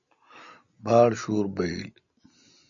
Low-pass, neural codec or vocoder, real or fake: 7.2 kHz; none; real